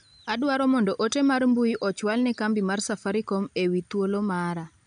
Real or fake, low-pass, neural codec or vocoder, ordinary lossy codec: real; 9.9 kHz; none; none